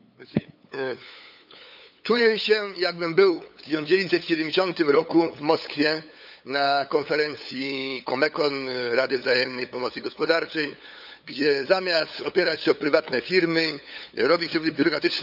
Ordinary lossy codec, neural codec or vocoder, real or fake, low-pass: none; codec, 16 kHz, 8 kbps, FunCodec, trained on LibriTTS, 25 frames a second; fake; 5.4 kHz